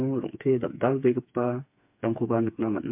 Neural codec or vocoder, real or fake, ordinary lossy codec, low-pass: codec, 16 kHz, 4 kbps, FreqCodec, smaller model; fake; MP3, 32 kbps; 3.6 kHz